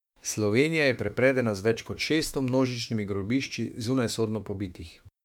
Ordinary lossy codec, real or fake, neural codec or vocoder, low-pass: MP3, 96 kbps; fake; autoencoder, 48 kHz, 32 numbers a frame, DAC-VAE, trained on Japanese speech; 19.8 kHz